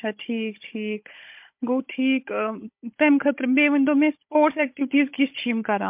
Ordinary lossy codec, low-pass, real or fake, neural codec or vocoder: MP3, 32 kbps; 3.6 kHz; fake; codec, 16 kHz, 16 kbps, FunCodec, trained on Chinese and English, 50 frames a second